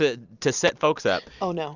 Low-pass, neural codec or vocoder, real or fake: 7.2 kHz; none; real